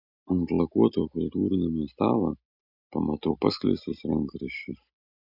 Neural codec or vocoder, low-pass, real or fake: none; 5.4 kHz; real